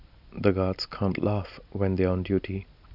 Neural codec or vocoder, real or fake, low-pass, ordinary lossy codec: none; real; 5.4 kHz; none